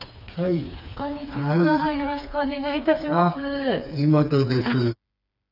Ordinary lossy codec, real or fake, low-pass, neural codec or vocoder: none; fake; 5.4 kHz; codec, 16 kHz, 4 kbps, FreqCodec, smaller model